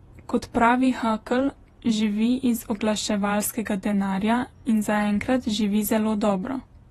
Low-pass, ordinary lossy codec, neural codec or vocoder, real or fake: 19.8 kHz; AAC, 32 kbps; vocoder, 48 kHz, 128 mel bands, Vocos; fake